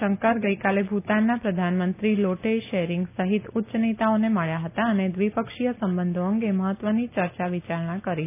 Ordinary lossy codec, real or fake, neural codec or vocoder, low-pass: none; real; none; 3.6 kHz